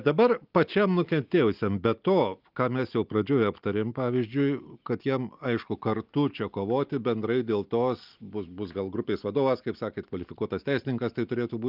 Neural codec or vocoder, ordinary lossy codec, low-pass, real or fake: none; Opus, 24 kbps; 5.4 kHz; real